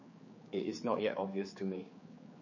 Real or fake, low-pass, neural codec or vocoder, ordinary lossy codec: fake; 7.2 kHz; codec, 16 kHz, 4 kbps, X-Codec, HuBERT features, trained on general audio; MP3, 32 kbps